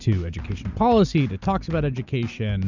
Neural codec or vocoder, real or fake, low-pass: none; real; 7.2 kHz